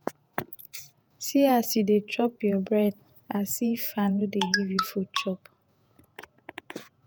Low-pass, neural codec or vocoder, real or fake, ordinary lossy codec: none; vocoder, 48 kHz, 128 mel bands, Vocos; fake; none